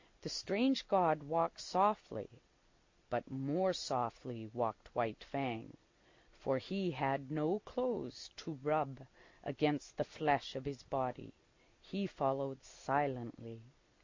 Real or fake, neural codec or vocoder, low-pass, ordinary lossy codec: real; none; 7.2 kHz; MP3, 48 kbps